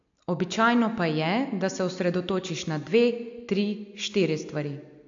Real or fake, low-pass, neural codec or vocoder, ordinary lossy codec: real; 7.2 kHz; none; AAC, 64 kbps